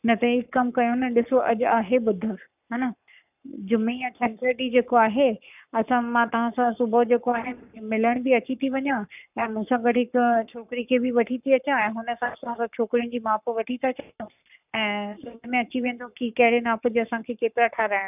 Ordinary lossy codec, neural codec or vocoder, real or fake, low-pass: none; codec, 44.1 kHz, 7.8 kbps, Pupu-Codec; fake; 3.6 kHz